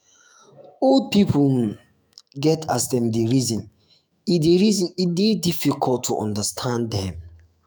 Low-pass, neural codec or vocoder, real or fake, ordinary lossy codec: none; autoencoder, 48 kHz, 128 numbers a frame, DAC-VAE, trained on Japanese speech; fake; none